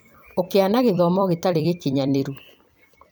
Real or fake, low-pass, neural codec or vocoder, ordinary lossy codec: fake; none; vocoder, 44.1 kHz, 128 mel bands every 256 samples, BigVGAN v2; none